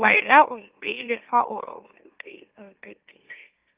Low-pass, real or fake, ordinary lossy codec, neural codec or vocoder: 3.6 kHz; fake; Opus, 32 kbps; autoencoder, 44.1 kHz, a latent of 192 numbers a frame, MeloTTS